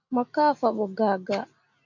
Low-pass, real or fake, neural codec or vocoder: 7.2 kHz; real; none